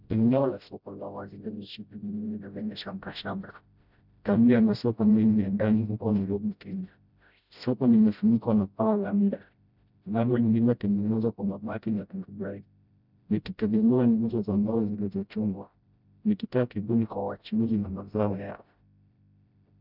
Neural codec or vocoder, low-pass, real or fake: codec, 16 kHz, 0.5 kbps, FreqCodec, smaller model; 5.4 kHz; fake